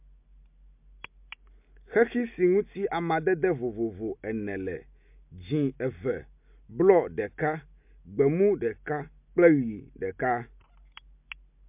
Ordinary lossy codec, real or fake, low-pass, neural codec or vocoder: MP3, 32 kbps; real; 3.6 kHz; none